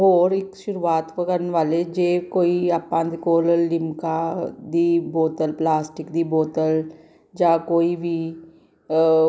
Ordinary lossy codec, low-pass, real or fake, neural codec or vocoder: none; none; real; none